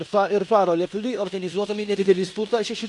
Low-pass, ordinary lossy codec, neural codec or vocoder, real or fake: 10.8 kHz; AAC, 64 kbps; codec, 16 kHz in and 24 kHz out, 0.9 kbps, LongCat-Audio-Codec, fine tuned four codebook decoder; fake